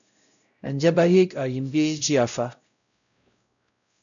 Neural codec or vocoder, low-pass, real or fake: codec, 16 kHz, 0.5 kbps, X-Codec, WavLM features, trained on Multilingual LibriSpeech; 7.2 kHz; fake